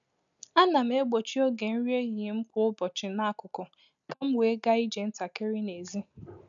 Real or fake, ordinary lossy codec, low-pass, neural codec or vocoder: real; none; 7.2 kHz; none